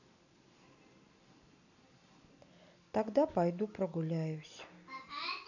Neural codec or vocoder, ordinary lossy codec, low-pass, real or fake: none; none; 7.2 kHz; real